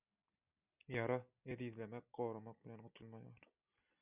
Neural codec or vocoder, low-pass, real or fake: none; 3.6 kHz; real